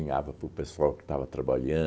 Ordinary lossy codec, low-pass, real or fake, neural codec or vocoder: none; none; real; none